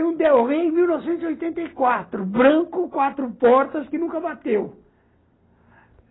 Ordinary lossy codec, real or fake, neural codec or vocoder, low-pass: AAC, 16 kbps; real; none; 7.2 kHz